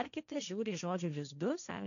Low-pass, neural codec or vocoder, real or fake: 7.2 kHz; codec, 16 kHz, 1.1 kbps, Voila-Tokenizer; fake